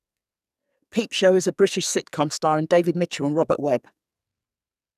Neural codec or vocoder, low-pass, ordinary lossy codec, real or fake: codec, 44.1 kHz, 2.6 kbps, SNAC; 14.4 kHz; none; fake